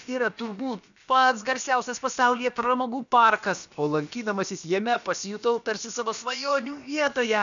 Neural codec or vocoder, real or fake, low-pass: codec, 16 kHz, about 1 kbps, DyCAST, with the encoder's durations; fake; 7.2 kHz